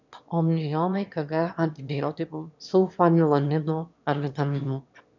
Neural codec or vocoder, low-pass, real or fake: autoencoder, 22.05 kHz, a latent of 192 numbers a frame, VITS, trained on one speaker; 7.2 kHz; fake